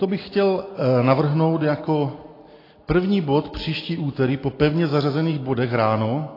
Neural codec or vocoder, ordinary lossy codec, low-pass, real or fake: none; AAC, 24 kbps; 5.4 kHz; real